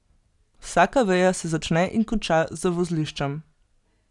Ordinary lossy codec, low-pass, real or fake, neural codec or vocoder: none; 10.8 kHz; real; none